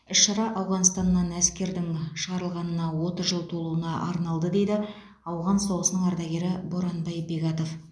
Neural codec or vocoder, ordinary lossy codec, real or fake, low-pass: none; none; real; none